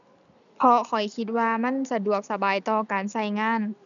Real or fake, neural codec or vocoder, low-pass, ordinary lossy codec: real; none; 7.2 kHz; none